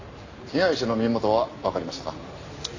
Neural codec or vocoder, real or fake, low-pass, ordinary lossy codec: none; real; 7.2 kHz; AAC, 48 kbps